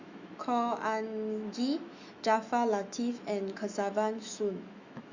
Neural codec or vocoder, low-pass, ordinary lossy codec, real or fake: autoencoder, 48 kHz, 128 numbers a frame, DAC-VAE, trained on Japanese speech; 7.2 kHz; Opus, 64 kbps; fake